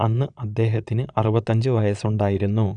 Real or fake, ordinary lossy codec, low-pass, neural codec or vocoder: fake; none; 9.9 kHz; vocoder, 22.05 kHz, 80 mel bands, Vocos